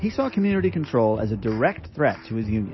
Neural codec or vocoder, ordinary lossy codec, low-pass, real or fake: none; MP3, 24 kbps; 7.2 kHz; real